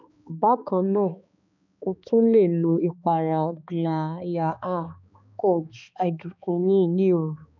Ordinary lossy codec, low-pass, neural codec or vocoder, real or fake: none; 7.2 kHz; codec, 16 kHz, 2 kbps, X-Codec, HuBERT features, trained on balanced general audio; fake